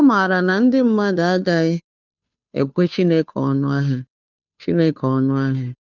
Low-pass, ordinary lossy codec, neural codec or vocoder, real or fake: 7.2 kHz; none; codec, 16 kHz, 2 kbps, FunCodec, trained on Chinese and English, 25 frames a second; fake